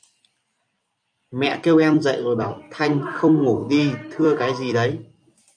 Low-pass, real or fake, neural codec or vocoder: 9.9 kHz; fake; vocoder, 44.1 kHz, 128 mel bands every 256 samples, BigVGAN v2